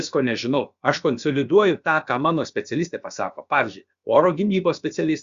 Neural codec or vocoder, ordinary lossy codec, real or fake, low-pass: codec, 16 kHz, about 1 kbps, DyCAST, with the encoder's durations; Opus, 64 kbps; fake; 7.2 kHz